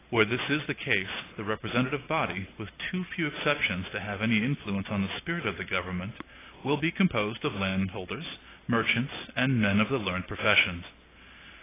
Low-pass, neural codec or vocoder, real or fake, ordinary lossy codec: 3.6 kHz; none; real; AAC, 16 kbps